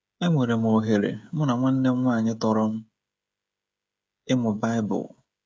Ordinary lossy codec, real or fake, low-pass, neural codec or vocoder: none; fake; none; codec, 16 kHz, 16 kbps, FreqCodec, smaller model